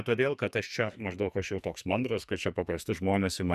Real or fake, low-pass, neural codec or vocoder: fake; 14.4 kHz; codec, 44.1 kHz, 2.6 kbps, SNAC